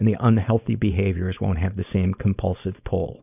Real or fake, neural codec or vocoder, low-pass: fake; codec, 16 kHz, 4.8 kbps, FACodec; 3.6 kHz